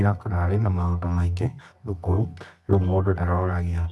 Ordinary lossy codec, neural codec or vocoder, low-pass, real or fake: none; codec, 24 kHz, 0.9 kbps, WavTokenizer, medium music audio release; none; fake